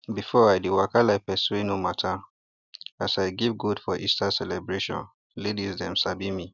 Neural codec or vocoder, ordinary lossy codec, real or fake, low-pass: none; none; real; 7.2 kHz